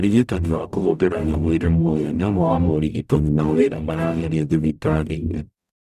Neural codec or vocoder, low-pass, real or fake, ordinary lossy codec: codec, 44.1 kHz, 0.9 kbps, DAC; 14.4 kHz; fake; none